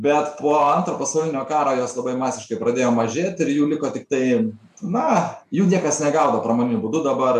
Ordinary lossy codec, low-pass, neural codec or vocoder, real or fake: AAC, 96 kbps; 14.4 kHz; none; real